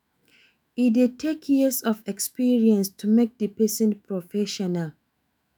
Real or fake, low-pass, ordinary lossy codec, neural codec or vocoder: fake; none; none; autoencoder, 48 kHz, 128 numbers a frame, DAC-VAE, trained on Japanese speech